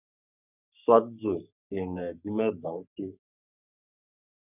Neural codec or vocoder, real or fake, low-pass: codec, 44.1 kHz, 3.4 kbps, Pupu-Codec; fake; 3.6 kHz